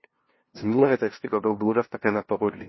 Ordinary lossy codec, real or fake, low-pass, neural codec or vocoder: MP3, 24 kbps; fake; 7.2 kHz; codec, 16 kHz, 0.5 kbps, FunCodec, trained on LibriTTS, 25 frames a second